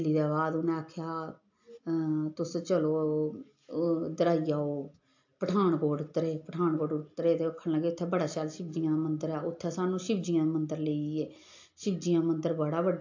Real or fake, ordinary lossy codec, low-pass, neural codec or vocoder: real; AAC, 48 kbps; 7.2 kHz; none